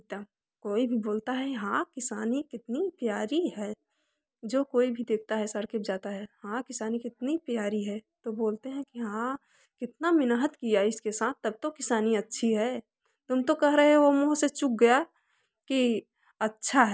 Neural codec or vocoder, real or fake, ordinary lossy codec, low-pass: none; real; none; none